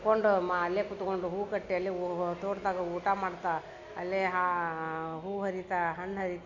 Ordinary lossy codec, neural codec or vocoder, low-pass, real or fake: MP3, 48 kbps; none; 7.2 kHz; real